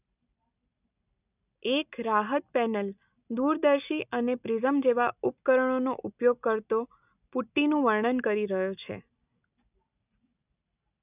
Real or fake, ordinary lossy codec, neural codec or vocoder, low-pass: real; none; none; 3.6 kHz